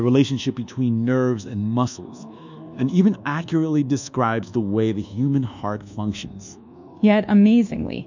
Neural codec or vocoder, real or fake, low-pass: codec, 24 kHz, 1.2 kbps, DualCodec; fake; 7.2 kHz